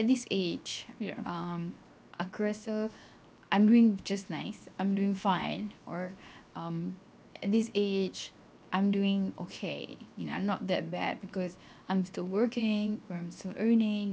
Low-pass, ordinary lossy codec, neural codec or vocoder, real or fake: none; none; codec, 16 kHz, 0.7 kbps, FocalCodec; fake